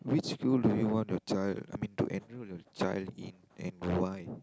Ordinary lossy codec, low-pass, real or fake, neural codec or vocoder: none; none; real; none